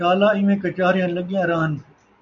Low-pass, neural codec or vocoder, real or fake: 7.2 kHz; none; real